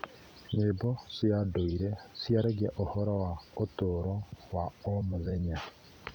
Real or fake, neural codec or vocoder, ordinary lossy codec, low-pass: fake; vocoder, 44.1 kHz, 128 mel bands every 256 samples, BigVGAN v2; none; 19.8 kHz